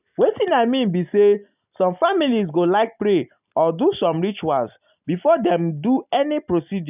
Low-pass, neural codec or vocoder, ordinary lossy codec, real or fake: 3.6 kHz; none; none; real